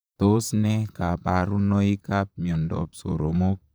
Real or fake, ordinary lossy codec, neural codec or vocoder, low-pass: fake; none; vocoder, 44.1 kHz, 128 mel bands, Pupu-Vocoder; none